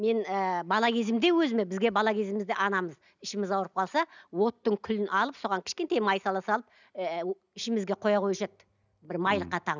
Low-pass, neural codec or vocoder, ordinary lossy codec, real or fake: 7.2 kHz; none; none; real